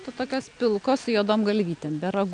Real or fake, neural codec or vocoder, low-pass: real; none; 9.9 kHz